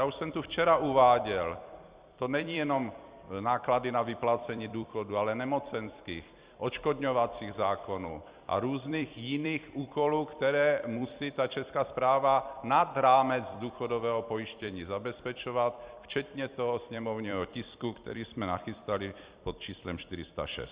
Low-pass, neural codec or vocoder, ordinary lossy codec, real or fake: 3.6 kHz; vocoder, 44.1 kHz, 128 mel bands every 256 samples, BigVGAN v2; Opus, 64 kbps; fake